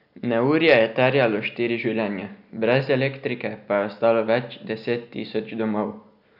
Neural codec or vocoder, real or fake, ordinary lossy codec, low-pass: none; real; none; 5.4 kHz